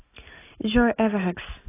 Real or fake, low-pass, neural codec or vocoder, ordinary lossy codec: real; 3.6 kHz; none; AAC, 16 kbps